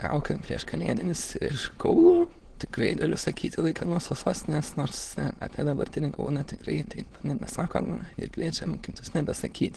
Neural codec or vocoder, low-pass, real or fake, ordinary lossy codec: autoencoder, 22.05 kHz, a latent of 192 numbers a frame, VITS, trained on many speakers; 9.9 kHz; fake; Opus, 16 kbps